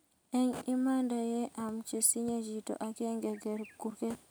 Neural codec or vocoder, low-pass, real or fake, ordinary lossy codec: none; none; real; none